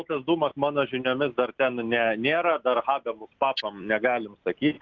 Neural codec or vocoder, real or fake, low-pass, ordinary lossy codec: none; real; 7.2 kHz; Opus, 32 kbps